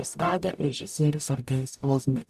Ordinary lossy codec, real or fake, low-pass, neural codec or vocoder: AAC, 96 kbps; fake; 14.4 kHz; codec, 44.1 kHz, 0.9 kbps, DAC